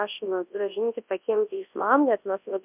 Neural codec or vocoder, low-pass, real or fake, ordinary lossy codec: codec, 24 kHz, 0.9 kbps, WavTokenizer, large speech release; 3.6 kHz; fake; MP3, 32 kbps